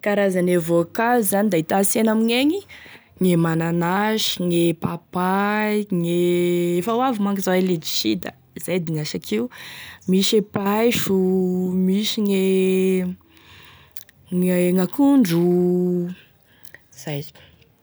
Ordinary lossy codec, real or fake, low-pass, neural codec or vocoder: none; real; none; none